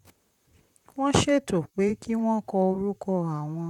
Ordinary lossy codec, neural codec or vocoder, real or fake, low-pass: none; vocoder, 44.1 kHz, 128 mel bands, Pupu-Vocoder; fake; 19.8 kHz